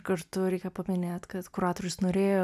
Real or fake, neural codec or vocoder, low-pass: real; none; 14.4 kHz